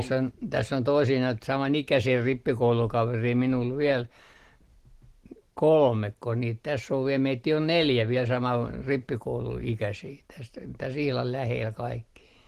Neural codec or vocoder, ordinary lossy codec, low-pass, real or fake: none; Opus, 16 kbps; 14.4 kHz; real